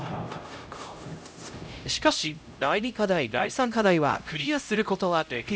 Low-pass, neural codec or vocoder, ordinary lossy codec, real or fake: none; codec, 16 kHz, 0.5 kbps, X-Codec, HuBERT features, trained on LibriSpeech; none; fake